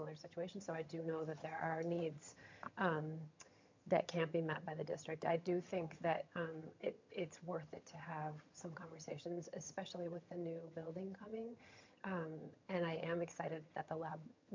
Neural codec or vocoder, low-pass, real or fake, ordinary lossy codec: vocoder, 22.05 kHz, 80 mel bands, HiFi-GAN; 7.2 kHz; fake; MP3, 64 kbps